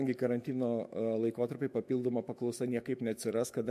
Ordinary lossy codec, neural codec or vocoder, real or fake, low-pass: MP3, 64 kbps; autoencoder, 48 kHz, 128 numbers a frame, DAC-VAE, trained on Japanese speech; fake; 14.4 kHz